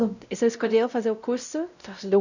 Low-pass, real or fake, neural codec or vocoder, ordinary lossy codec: 7.2 kHz; fake; codec, 16 kHz, 0.5 kbps, X-Codec, WavLM features, trained on Multilingual LibriSpeech; none